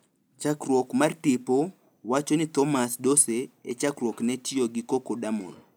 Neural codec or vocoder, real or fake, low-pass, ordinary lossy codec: none; real; none; none